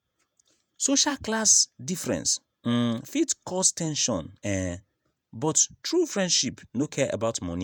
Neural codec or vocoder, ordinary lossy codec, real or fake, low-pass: vocoder, 48 kHz, 128 mel bands, Vocos; none; fake; none